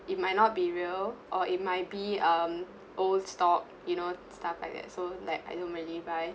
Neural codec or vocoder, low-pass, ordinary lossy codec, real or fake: none; none; none; real